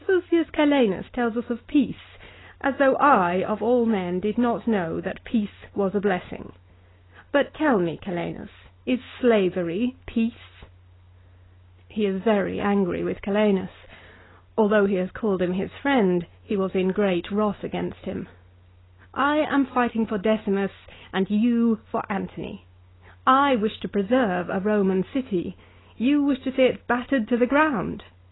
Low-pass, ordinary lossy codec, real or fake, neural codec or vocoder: 7.2 kHz; AAC, 16 kbps; real; none